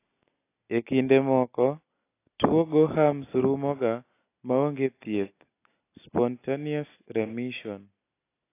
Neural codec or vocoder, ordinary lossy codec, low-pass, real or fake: none; AAC, 24 kbps; 3.6 kHz; real